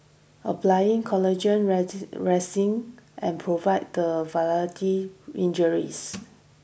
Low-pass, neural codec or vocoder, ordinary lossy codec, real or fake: none; none; none; real